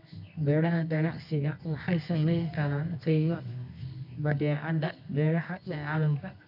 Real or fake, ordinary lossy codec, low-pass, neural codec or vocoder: fake; none; 5.4 kHz; codec, 24 kHz, 0.9 kbps, WavTokenizer, medium music audio release